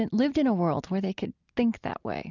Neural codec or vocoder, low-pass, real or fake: none; 7.2 kHz; real